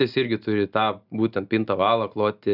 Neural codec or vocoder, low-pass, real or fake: none; 5.4 kHz; real